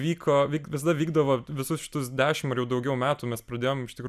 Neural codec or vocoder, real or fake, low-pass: none; real; 14.4 kHz